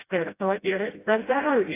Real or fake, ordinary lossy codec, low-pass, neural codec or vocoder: fake; AAC, 16 kbps; 3.6 kHz; codec, 16 kHz, 0.5 kbps, FreqCodec, smaller model